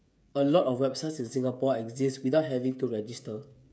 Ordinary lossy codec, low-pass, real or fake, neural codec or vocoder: none; none; fake; codec, 16 kHz, 16 kbps, FreqCodec, smaller model